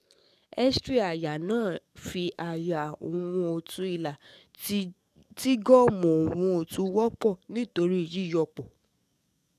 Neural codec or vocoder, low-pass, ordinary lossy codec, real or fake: codec, 44.1 kHz, 7.8 kbps, DAC; 14.4 kHz; none; fake